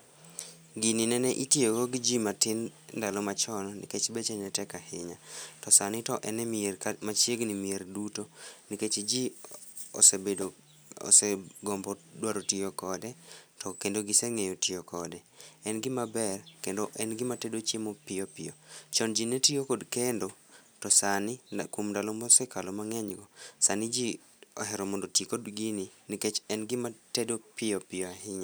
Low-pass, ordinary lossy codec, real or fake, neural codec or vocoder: none; none; real; none